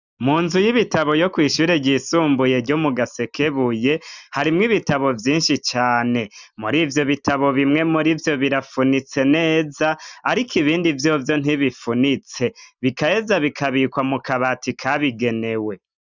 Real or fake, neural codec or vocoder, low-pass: real; none; 7.2 kHz